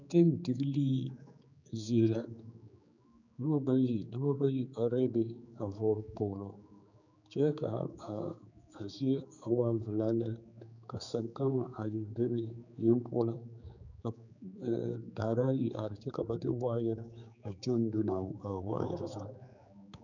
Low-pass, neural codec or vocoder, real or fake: 7.2 kHz; codec, 16 kHz, 4 kbps, X-Codec, HuBERT features, trained on general audio; fake